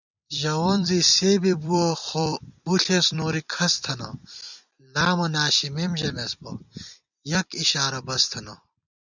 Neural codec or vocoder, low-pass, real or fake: vocoder, 44.1 kHz, 128 mel bands every 256 samples, BigVGAN v2; 7.2 kHz; fake